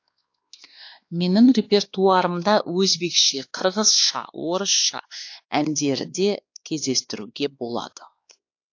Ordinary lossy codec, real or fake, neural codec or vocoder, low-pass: AAC, 48 kbps; fake; codec, 16 kHz, 2 kbps, X-Codec, WavLM features, trained on Multilingual LibriSpeech; 7.2 kHz